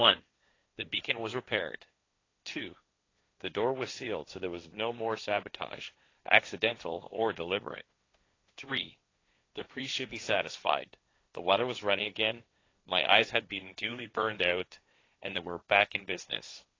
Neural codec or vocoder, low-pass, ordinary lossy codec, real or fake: codec, 16 kHz, 1.1 kbps, Voila-Tokenizer; 7.2 kHz; AAC, 32 kbps; fake